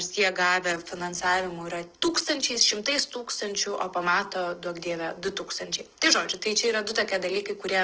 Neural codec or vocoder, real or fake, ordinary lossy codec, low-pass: none; real; Opus, 16 kbps; 7.2 kHz